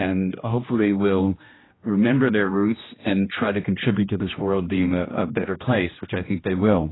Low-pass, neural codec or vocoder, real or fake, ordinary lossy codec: 7.2 kHz; codec, 16 kHz, 1 kbps, X-Codec, HuBERT features, trained on general audio; fake; AAC, 16 kbps